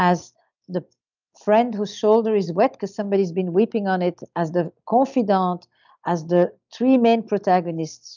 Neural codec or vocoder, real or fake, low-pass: none; real; 7.2 kHz